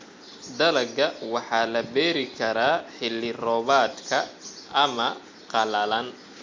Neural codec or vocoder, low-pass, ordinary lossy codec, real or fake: none; 7.2 kHz; MP3, 48 kbps; real